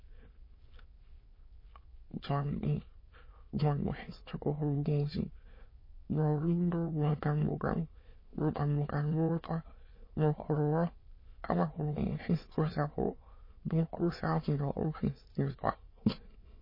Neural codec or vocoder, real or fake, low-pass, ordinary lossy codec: autoencoder, 22.05 kHz, a latent of 192 numbers a frame, VITS, trained on many speakers; fake; 5.4 kHz; MP3, 24 kbps